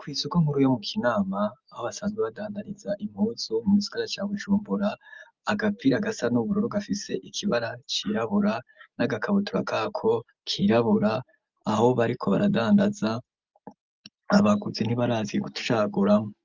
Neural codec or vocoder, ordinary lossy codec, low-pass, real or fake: none; Opus, 24 kbps; 7.2 kHz; real